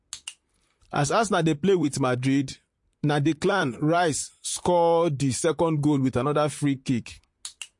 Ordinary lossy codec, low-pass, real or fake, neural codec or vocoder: MP3, 48 kbps; 10.8 kHz; fake; vocoder, 44.1 kHz, 128 mel bands, Pupu-Vocoder